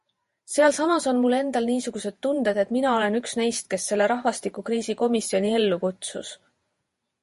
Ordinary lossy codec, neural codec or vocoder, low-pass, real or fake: MP3, 48 kbps; vocoder, 48 kHz, 128 mel bands, Vocos; 14.4 kHz; fake